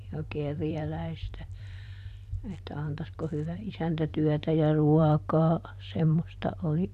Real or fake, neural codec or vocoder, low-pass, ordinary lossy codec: fake; vocoder, 44.1 kHz, 128 mel bands every 512 samples, BigVGAN v2; 14.4 kHz; none